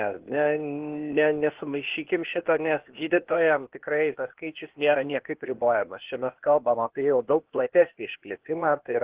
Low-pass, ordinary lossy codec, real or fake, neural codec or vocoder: 3.6 kHz; Opus, 16 kbps; fake; codec, 16 kHz, 0.8 kbps, ZipCodec